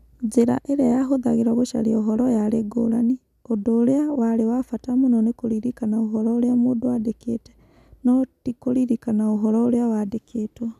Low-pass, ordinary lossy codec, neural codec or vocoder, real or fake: 14.4 kHz; none; none; real